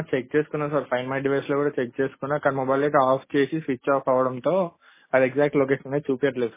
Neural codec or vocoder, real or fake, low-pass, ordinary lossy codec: none; real; 3.6 kHz; MP3, 16 kbps